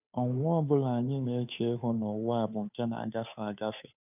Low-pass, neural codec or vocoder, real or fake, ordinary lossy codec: 3.6 kHz; codec, 16 kHz, 2 kbps, FunCodec, trained on Chinese and English, 25 frames a second; fake; none